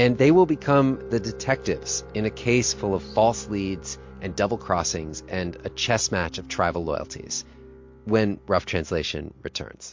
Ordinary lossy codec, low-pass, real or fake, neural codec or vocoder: MP3, 48 kbps; 7.2 kHz; real; none